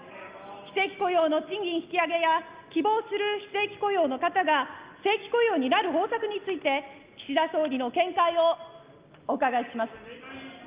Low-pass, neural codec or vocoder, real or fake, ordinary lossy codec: 3.6 kHz; none; real; Opus, 24 kbps